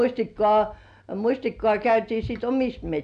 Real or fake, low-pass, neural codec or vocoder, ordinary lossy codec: real; 9.9 kHz; none; AAC, 96 kbps